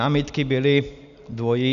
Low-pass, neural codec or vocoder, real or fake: 7.2 kHz; none; real